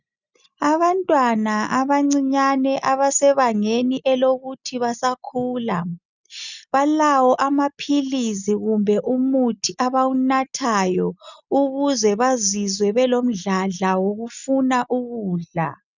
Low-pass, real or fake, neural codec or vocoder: 7.2 kHz; real; none